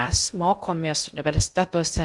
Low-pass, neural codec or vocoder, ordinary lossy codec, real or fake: 10.8 kHz; codec, 16 kHz in and 24 kHz out, 0.6 kbps, FocalCodec, streaming, 2048 codes; Opus, 64 kbps; fake